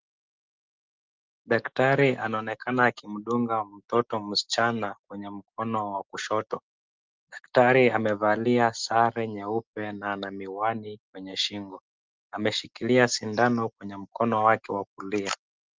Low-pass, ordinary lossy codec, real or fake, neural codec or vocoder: 7.2 kHz; Opus, 24 kbps; real; none